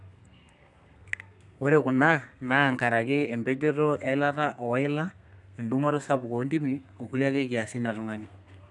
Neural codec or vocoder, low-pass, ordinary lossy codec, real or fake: codec, 32 kHz, 1.9 kbps, SNAC; 10.8 kHz; none; fake